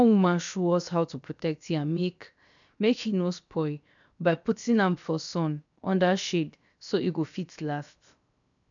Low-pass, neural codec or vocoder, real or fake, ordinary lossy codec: 7.2 kHz; codec, 16 kHz, 0.7 kbps, FocalCodec; fake; none